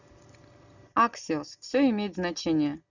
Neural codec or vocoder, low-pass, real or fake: none; 7.2 kHz; real